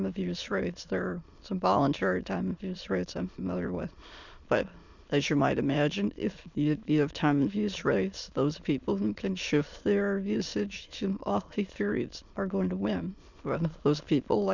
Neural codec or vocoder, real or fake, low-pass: autoencoder, 22.05 kHz, a latent of 192 numbers a frame, VITS, trained on many speakers; fake; 7.2 kHz